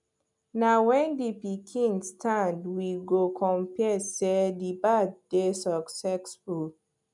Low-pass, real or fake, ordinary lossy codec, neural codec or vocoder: 10.8 kHz; real; none; none